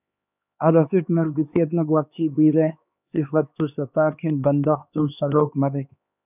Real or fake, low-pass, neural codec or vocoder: fake; 3.6 kHz; codec, 16 kHz, 2 kbps, X-Codec, HuBERT features, trained on LibriSpeech